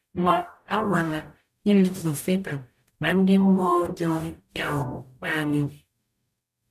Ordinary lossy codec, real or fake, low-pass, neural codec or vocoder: none; fake; 14.4 kHz; codec, 44.1 kHz, 0.9 kbps, DAC